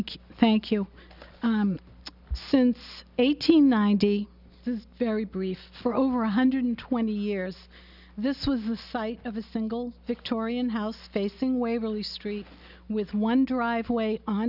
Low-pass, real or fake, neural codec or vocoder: 5.4 kHz; real; none